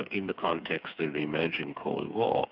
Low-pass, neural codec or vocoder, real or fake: 5.4 kHz; codec, 16 kHz, 4 kbps, FreqCodec, smaller model; fake